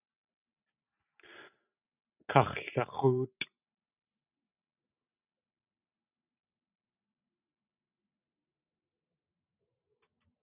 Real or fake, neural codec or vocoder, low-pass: real; none; 3.6 kHz